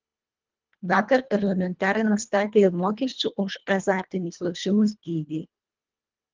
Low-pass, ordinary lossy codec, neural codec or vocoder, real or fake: 7.2 kHz; Opus, 32 kbps; codec, 24 kHz, 1.5 kbps, HILCodec; fake